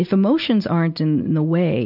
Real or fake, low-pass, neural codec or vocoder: real; 5.4 kHz; none